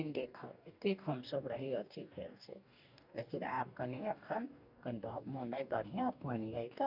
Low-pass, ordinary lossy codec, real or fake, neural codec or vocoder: 5.4 kHz; none; fake; codec, 44.1 kHz, 2.6 kbps, DAC